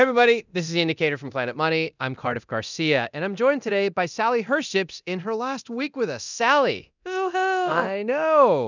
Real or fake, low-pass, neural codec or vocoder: fake; 7.2 kHz; codec, 24 kHz, 0.9 kbps, DualCodec